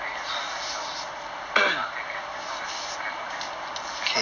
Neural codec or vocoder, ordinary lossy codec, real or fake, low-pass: none; none; real; 7.2 kHz